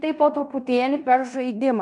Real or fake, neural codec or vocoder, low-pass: fake; codec, 16 kHz in and 24 kHz out, 0.9 kbps, LongCat-Audio-Codec, fine tuned four codebook decoder; 10.8 kHz